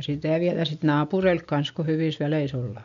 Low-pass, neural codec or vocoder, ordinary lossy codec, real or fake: 7.2 kHz; none; MP3, 64 kbps; real